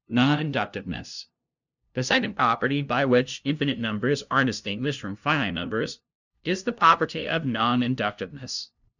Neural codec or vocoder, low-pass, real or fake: codec, 16 kHz, 0.5 kbps, FunCodec, trained on LibriTTS, 25 frames a second; 7.2 kHz; fake